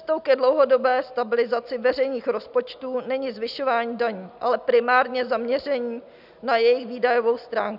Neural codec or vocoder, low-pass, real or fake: none; 5.4 kHz; real